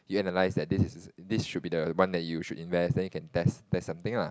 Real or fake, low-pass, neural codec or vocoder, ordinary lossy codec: real; none; none; none